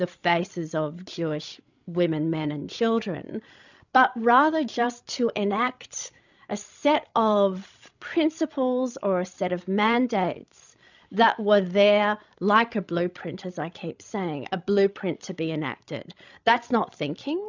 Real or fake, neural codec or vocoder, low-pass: fake; codec, 16 kHz, 16 kbps, FreqCodec, larger model; 7.2 kHz